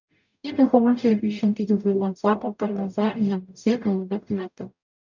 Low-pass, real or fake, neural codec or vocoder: 7.2 kHz; fake; codec, 44.1 kHz, 0.9 kbps, DAC